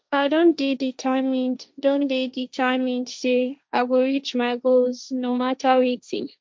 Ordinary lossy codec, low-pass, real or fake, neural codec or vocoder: none; none; fake; codec, 16 kHz, 1.1 kbps, Voila-Tokenizer